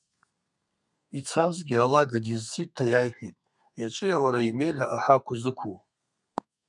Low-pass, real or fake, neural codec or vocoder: 10.8 kHz; fake; codec, 32 kHz, 1.9 kbps, SNAC